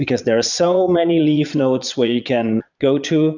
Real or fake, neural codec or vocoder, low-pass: fake; vocoder, 22.05 kHz, 80 mel bands, Vocos; 7.2 kHz